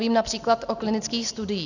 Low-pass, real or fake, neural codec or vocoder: 7.2 kHz; real; none